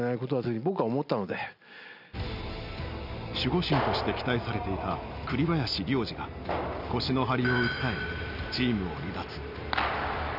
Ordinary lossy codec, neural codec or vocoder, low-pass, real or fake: none; none; 5.4 kHz; real